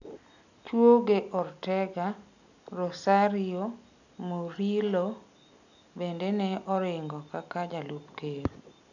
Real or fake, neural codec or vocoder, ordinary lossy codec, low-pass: real; none; none; 7.2 kHz